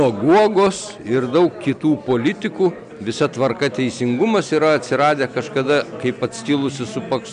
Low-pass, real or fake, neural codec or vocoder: 9.9 kHz; real; none